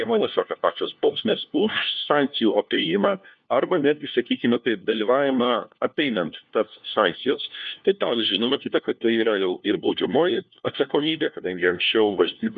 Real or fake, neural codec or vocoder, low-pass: fake; codec, 16 kHz, 1 kbps, FunCodec, trained on LibriTTS, 50 frames a second; 7.2 kHz